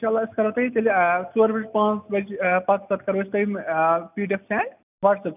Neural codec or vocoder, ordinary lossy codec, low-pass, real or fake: none; none; 3.6 kHz; real